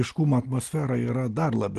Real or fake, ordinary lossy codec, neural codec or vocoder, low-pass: real; Opus, 16 kbps; none; 10.8 kHz